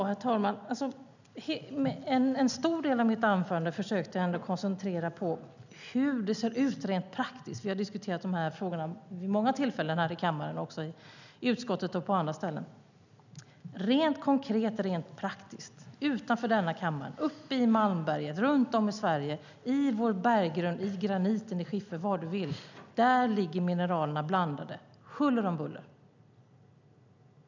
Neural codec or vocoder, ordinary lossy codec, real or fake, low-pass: none; none; real; 7.2 kHz